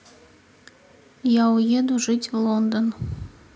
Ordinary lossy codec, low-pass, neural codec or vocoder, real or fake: none; none; none; real